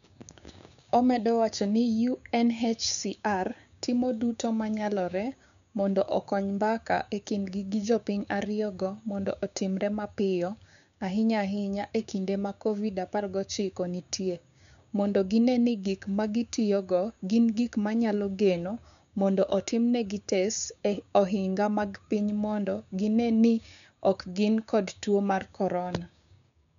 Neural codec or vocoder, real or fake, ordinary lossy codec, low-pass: codec, 16 kHz, 6 kbps, DAC; fake; none; 7.2 kHz